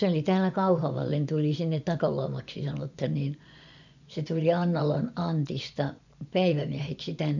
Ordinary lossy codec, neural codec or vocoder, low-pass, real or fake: none; vocoder, 24 kHz, 100 mel bands, Vocos; 7.2 kHz; fake